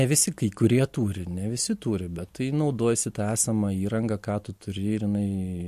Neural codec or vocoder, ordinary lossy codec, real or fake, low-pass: none; MP3, 64 kbps; real; 14.4 kHz